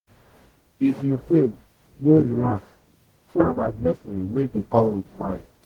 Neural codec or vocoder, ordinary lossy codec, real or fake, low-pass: codec, 44.1 kHz, 0.9 kbps, DAC; Opus, 16 kbps; fake; 19.8 kHz